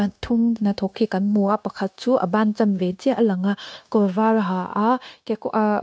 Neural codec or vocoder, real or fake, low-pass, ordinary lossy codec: codec, 16 kHz, 0.9 kbps, LongCat-Audio-Codec; fake; none; none